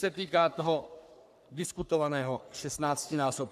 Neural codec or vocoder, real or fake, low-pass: codec, 44.1 kHz, 3.4 kbps, Pupu-Codec; fake; 14.4 kHz